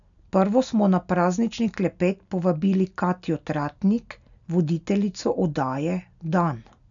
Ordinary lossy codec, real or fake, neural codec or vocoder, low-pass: none; real; none; 7.2 kHz